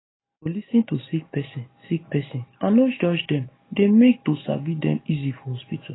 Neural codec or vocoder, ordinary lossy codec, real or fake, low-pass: none; AAC, 16 kbps; real; 7.2 kHz